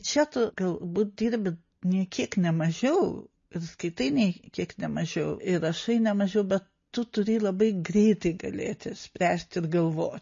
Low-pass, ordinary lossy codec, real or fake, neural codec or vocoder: 7.2 kHz; MP3, 32 kbps; real; none